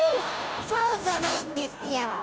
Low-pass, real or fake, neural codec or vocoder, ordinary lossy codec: none; fake; codec, 16 kHz, 0.5 kbps, FunCodec, trained on Chinese and English, 25 frames a second; none